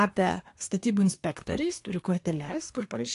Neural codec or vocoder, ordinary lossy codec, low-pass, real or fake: codec, 24 kHz, 1 kbps, SNAC; AAC, 48 kbps; 10.8 kHz; fake